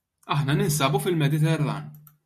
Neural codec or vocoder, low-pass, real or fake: none; 14.4 kHz; real